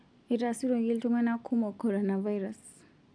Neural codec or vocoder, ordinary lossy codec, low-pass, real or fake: none; none; 9.9 kHz; real